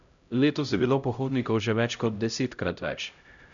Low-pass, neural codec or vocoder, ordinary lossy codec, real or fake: 7.2 kHz; codec, 16 kHz, 0.5 kbps, X-Codec, HuBERT features, trained on LibriSpeech; none; fake